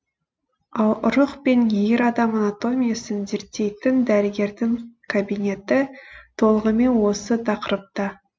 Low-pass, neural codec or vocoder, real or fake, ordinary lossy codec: none; none; real; none